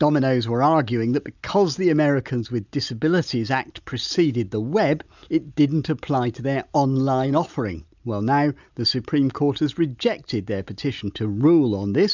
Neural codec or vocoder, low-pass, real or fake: none; 7.2 kHz; real